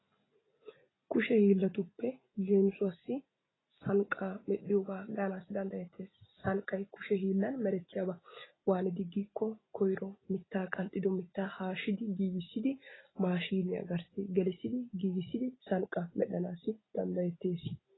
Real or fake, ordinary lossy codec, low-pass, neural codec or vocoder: real; AAC, 16 kbps; 7.2 kHz; none